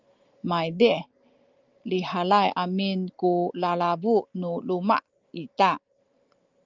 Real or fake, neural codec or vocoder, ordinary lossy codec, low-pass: real; none; Opus, 32 kbps; 7.2 kHz